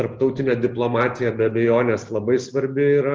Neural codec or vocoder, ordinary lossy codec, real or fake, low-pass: none; Opus, 24 kbps; real; 7.2 kHz